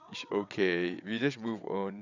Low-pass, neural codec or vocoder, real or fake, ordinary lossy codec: 7.2 kHz; vocoder, 22.05 kHz, 80 mel bands, WaveNeXt; fake; none